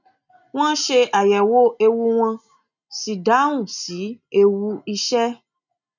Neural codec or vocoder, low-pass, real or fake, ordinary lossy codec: none; 7.2 kHz; real; none